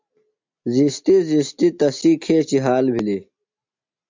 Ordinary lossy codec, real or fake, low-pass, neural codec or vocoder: MP3, 64 kbps; real; 7.2 kHz; none